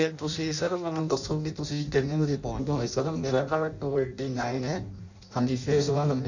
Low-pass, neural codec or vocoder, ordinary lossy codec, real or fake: 7.2 kHz; codec, 16 kHz in and 24 kHz out, 0.6 kbps, FireRedTTS-2 codec; none; fake